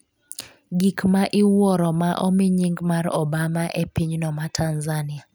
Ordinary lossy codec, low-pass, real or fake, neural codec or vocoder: none; none; real; none